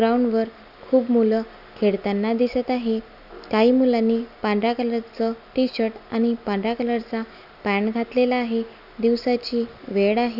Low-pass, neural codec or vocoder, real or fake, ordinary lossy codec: 5.4 kHz; none; real; none